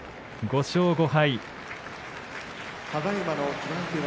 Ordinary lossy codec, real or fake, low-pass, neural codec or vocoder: none; real; none; none